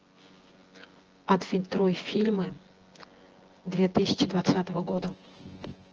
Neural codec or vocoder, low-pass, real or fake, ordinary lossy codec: vocoder, 24 kHz, 100 mel bands, Vocos; 7.2 kHz; fake; Opus, 16 kbps